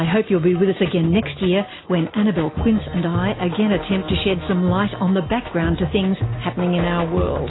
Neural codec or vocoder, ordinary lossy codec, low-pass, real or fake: none; AAC, 16 kbps; 7.2 kHz; real